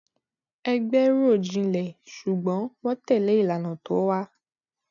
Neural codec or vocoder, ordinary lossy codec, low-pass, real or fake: none; none; 7.2 kHz; real